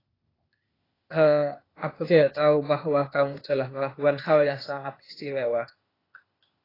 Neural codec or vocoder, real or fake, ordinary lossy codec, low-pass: codec, 16 kHz, 0.8 kbps, ZipCodec; fake; AAC, 24 kbps; 5.4 kHz